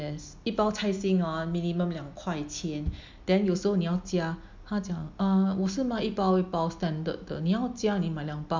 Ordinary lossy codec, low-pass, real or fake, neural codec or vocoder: MP3, 64 kbps; 7.2 kHz; real; none